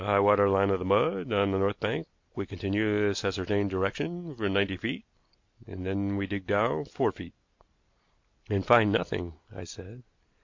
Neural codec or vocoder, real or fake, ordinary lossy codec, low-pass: none; real; MP3, 48 kbps; 7.2 kHz